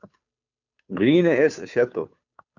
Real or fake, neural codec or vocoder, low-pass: fake; codec, 16 kHz, 2 kbps, FunCodec, trained on Chinese and English, 25 frames a second; 7.2 kHz